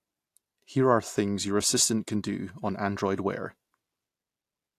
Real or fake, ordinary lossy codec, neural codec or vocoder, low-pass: real; AAC, 64 kbps; none; 14.4 kHz